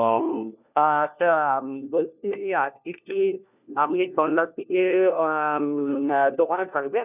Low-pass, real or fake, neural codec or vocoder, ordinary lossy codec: 3.6 kHz; fake; codec, 16 kHz, 1 kbps, FunCodec, trained on LibriTTS, 50 frames a second; none